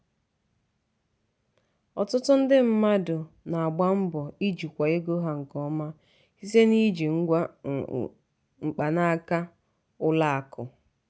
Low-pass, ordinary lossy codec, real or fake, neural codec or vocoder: none; none; real; none